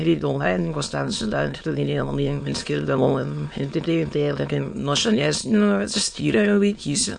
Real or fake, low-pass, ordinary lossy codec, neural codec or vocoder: fake; 9.9 kHz; MP3, 48 kbps; autoencoder, 22.05 kHz, a latent of 192 numbers a frame, VITS, trained on many speakers